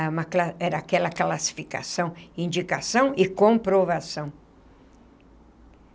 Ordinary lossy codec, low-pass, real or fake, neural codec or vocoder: none; none; real; none